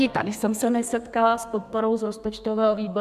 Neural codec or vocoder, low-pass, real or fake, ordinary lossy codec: codec, 32 kHz, 1.9 kbps, SNAC; 14.4 kHz; fake; AAC, 96 kbps